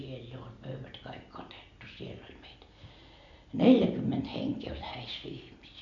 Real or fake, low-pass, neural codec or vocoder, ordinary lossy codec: real; 7.2 kHz; none; none